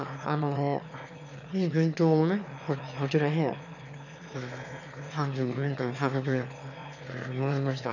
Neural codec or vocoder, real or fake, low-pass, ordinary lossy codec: autoencoder, 22.05 kHz, a latent of 192 numbers a frame, VITS, trained on one speaker; fake; 7.2 kHz; none